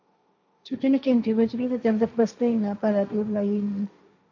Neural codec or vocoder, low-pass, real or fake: codec, 16 kHz, 1.1 kbps, Voila-Tokenizer; 7.2 kHz; fake